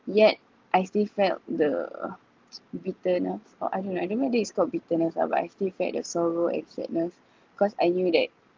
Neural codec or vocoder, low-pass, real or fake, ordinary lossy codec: none; 7.2 kHz; real; Opus, 32 kbps